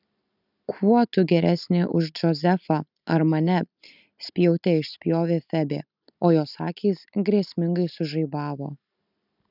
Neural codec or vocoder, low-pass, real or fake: none; 5.4 kHz; real